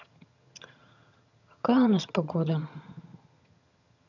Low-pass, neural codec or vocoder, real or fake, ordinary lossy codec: 7.2 kHz; vocoder, 22.05 kHz, 80 mel bands, HiFi-GAN; fake; none